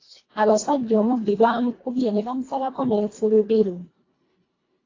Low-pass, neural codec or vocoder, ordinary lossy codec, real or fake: 7.2 kHz; codec, 24 kHz, 1.5 kbps, HILCodec; AAC, 32 kbps; fake